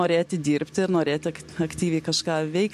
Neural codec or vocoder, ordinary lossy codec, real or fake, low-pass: none; MP3, 64 kbps; real; 14.4 kHz